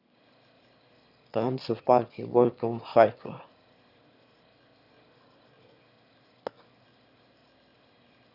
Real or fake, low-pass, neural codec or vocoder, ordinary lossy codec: fake; 5.4 kHz; autoencoder, 22.05 kHz, a latent of 192 numbers a frame, VITS, trained on one speaker; none